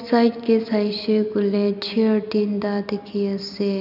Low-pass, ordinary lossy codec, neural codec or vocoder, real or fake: 5.4 kHz; none; none; real